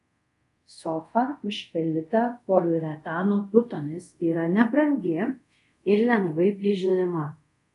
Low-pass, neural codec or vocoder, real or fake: 10.8 kHz; codec, 24 kHz, 0.5 kbps, DualCodec; fake